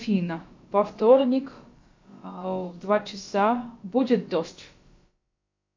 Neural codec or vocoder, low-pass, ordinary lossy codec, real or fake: codec, 16 kHz, about 1 kbps, DyCAST, with the encoder's durations; 7.2 kHz; MP3, 48 kbps; fake